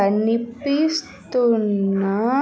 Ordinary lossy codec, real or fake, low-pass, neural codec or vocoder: none; real; none; none